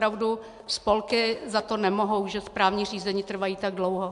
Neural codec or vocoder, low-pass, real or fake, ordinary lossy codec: none; 10.8 kHz; real; MP3, 64 kbps